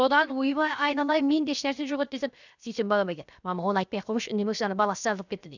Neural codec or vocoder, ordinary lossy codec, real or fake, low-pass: codec, 16 kHz, 0.7 kbps, FocalCodec; none; fake; 7.2 kHz